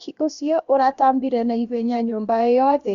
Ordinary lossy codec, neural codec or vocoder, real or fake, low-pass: Opus, 64 kbps; codec, 16 kHz, about 1 kbps, DyCAST, with the encoder's durations; fake; 7.2 kHz